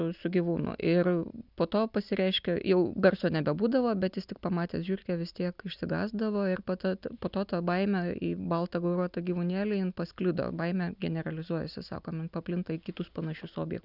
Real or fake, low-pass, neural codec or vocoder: real; 5.4 kHz; none